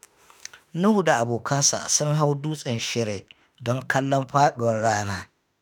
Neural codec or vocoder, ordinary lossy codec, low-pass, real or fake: autoencoder, 48 kHz, 32 numbers a frame, DAC-VAE, trained on Japanese speech; none; none; fake